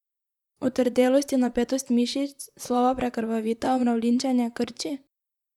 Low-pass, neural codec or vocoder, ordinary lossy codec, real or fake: 19.8 kHz; vocoder, 44.1 kHz, 128 mel bands, Pupu-Vocoder; none; fake